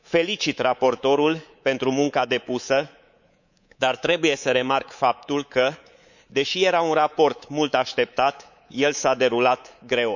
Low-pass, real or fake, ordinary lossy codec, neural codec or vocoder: 7.2 kHz; fake; none; codec, 24 kHz, 3.1 kbps, DualCodec